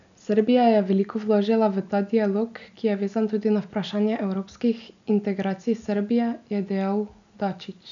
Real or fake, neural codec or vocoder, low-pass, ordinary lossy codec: real; none; 7.2 kHz; none